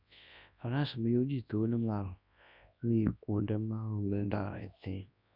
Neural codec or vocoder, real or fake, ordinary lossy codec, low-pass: codec, 24 kHz, 0.9 kbps, WavTokenizer, large speech release; fake; none; 5.4 kHz